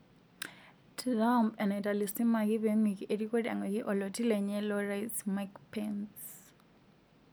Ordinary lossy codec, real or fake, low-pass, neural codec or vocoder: none; real; none; none